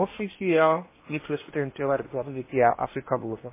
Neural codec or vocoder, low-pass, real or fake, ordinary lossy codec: codec, 16 kHz in and 24 kHz out, 0.8 kbps, FocalCodec, streaming, 65536 codes; 3.6 kHz; fake; MP3, 16 kbps